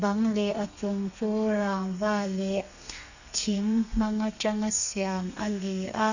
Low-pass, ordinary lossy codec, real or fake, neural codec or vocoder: 7.2 kHz; none; fake; codec, 32 kHz, 1.9 kbps, SNAC